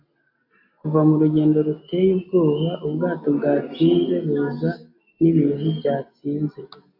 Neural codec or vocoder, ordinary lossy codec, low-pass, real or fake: none; AAC, 48 kbps; 5.4 kHz; real